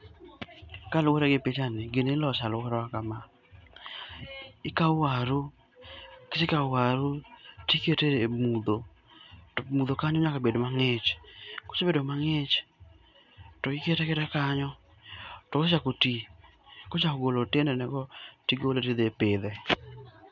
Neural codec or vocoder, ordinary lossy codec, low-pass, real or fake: none; none; 7.2 kHz; real